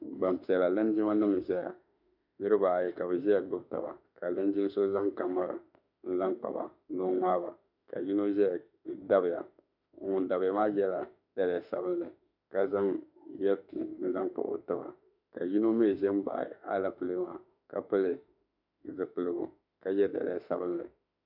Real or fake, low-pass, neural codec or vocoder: fake; 5.4 kHz; autoencoder, 48 kHz, 32 numbers a frame, DAC-VAE, trained on Japanese speech